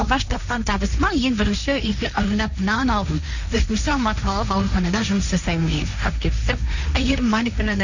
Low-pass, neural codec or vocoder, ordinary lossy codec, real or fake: 7.2 kHz; codec, 16 kHz, 1.1 kbps, Voila-Tokenizer; none; fake